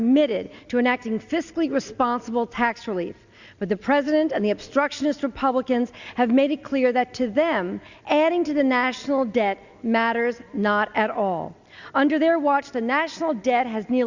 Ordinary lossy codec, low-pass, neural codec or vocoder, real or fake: Opus, 64 kbps; 7.2 kHz; none; real